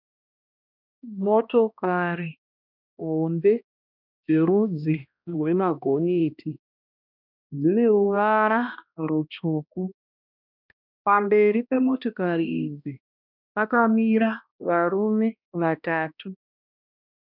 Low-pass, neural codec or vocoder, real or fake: 5.4 kHz; codec, 16 kHz, 1 kbps, X-Codec, HuBERT features, trained on balanced general audio; fake